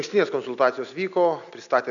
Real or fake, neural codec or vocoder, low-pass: real; none; 7.2 kHz